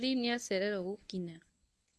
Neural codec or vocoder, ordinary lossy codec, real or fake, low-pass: codec, 24 kHz, 0.9 kbps, WavTokenizer, medium speech release version 1; none; fake; none